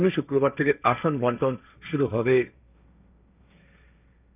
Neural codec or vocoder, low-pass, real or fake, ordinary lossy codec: codec, 16 kHz, 1.1 kbps, Voila-Tokenizer; 3.6 kHz; fake; none